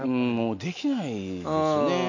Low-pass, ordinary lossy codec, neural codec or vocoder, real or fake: 7.2 kHz; none; none; real